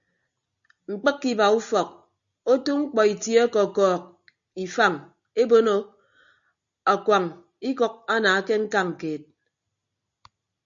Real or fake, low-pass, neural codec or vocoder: real; 7.2 kHz; none